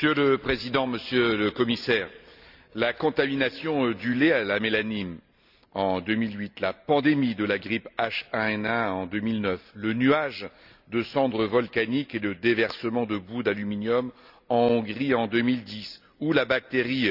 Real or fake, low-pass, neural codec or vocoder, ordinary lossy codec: real; 5.4 kHz; none; none